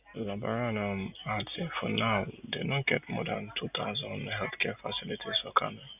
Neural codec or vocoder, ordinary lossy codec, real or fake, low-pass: none; none; real; 3.6 kHz